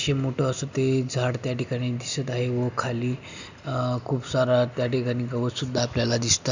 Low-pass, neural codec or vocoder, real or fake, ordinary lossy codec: 7.2 kHz; none; real; none